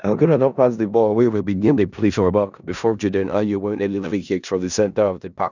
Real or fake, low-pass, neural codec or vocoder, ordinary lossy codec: fake; 7.2 kHz; codec, 16 kHz in and 24 kHz out, 0.4 kbps, LongCat-Audio-Codec, four codebook decoder; none